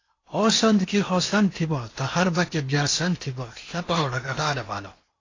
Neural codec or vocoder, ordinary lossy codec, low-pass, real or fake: codec, 16 kHz in and 24 kHz out, 0.8 kbps, FocalCodec, streaming, 65536 codes; AAC, 32 kbps; 7.2 kHz; fake